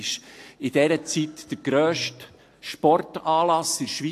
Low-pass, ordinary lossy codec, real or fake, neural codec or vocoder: 14.4 kHz; AAC, 64 kbps; real; none